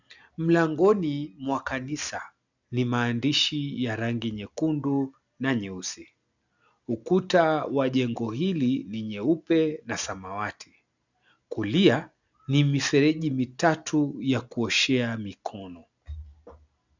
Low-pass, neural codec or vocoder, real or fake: 7.2 kHz; none; real